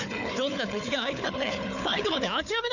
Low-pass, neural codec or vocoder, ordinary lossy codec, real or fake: 7.2 kHz; codec, 16 kHz, 4 kbps, FunCodec, trained on Chinese and English, 50 frames a second; none; fake